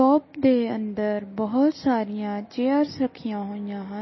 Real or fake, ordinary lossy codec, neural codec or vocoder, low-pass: real; MP3, 24 kbps; none; 7.2 kHz